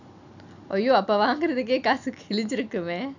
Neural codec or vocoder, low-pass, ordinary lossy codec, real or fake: none; 7.2 kHz; none; real